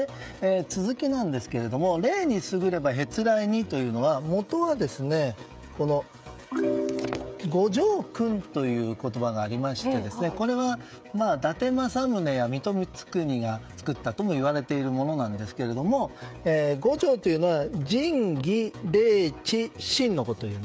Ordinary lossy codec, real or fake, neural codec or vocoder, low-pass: none; fake; codec, 16 kHz, 16 kbps, FreqCodec, smaller model; none